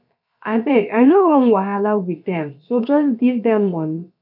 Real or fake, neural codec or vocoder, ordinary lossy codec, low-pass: fake; codec, 16 kHz, about 1 kbps, DyCAST, with the encoder's durations; none; 5.4 kHz